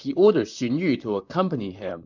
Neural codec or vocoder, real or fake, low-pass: vocoder, 44.1 kHz, 128 mel bands, Pupu-Vocoder; fake; 7.2 kHz